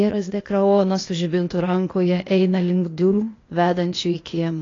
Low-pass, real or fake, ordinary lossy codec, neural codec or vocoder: 7.2 kHz; fake; AAC, 32 kbps; codec, 16 kHz, 0.8 kbps, ZipCodec